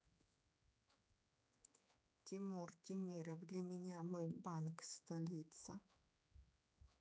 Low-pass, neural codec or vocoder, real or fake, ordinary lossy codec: none; codec, 16 kHz, 4 kbps, X-Codec, HuBERT features, trained on general audio; fake; none